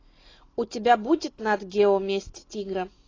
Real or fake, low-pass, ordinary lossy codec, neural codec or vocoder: real; 7.2 kHz; AAC, 32 kbps; none